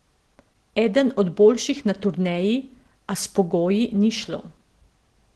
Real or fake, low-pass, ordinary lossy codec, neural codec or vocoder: real; 10.8 kHz; Opus, 16 kbps; none